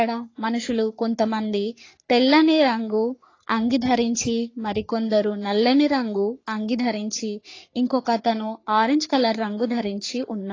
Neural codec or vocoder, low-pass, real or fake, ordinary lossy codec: codec, 44.1 kHz, 3.4 kbps, Pupu-Codec; 7.2 kHz; fake; AAC, 32 kbps